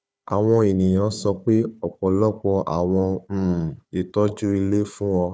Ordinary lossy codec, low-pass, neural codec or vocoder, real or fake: none; none; codec, 16 kHz, 4 kbps, FunCodec, trained on Chinese and English, 50 frames a second; fake